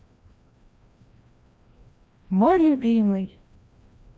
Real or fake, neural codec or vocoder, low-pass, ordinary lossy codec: fake; codec, 16 kHz, 1 kbps, FreqCodec, larger model; none; none